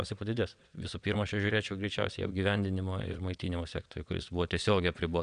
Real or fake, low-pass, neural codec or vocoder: fake; 9.9 kHz; vocoder, 22.05 kHz, 80 mel bands, WaveNeXt